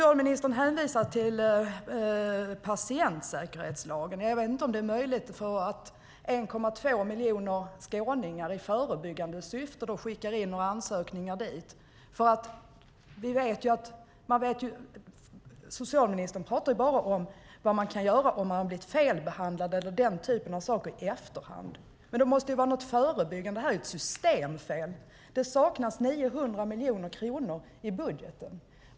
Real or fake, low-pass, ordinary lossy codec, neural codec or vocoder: real; none; none; none